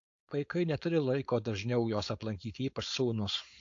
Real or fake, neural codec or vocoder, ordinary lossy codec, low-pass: fake; codec, 16 kHz, 4.8 kbps, FACodec; AAC, 48 kbps; 7.2 kHz